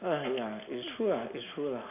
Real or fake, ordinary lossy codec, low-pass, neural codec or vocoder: real; none; 3.6 kHz; none